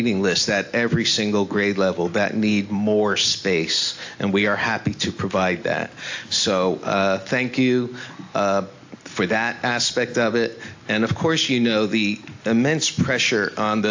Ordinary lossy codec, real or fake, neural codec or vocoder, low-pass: AAC, 48 kbps; real; none; 7.2 kHz